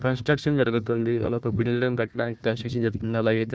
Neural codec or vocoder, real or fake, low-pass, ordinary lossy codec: codec, 16 kHz, 1 kbps, FunCodec, trained on Chinese and English, 50 frames a second; fake; none; none